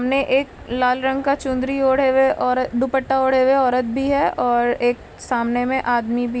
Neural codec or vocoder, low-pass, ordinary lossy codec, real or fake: none; none; none; real